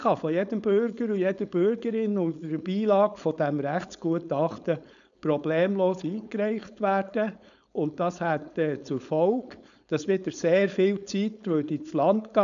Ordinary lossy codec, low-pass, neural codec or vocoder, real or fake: none; 7.2 kHz; codec, 16 kHz, 4.8 kbps, FACodec; fake